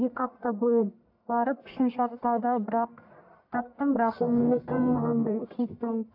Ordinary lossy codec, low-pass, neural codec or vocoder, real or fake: AAC, 48 kbps; 5.4 kHz; codec, 44.1 kHz, 1.7 kbps, Pupu-Codec; fake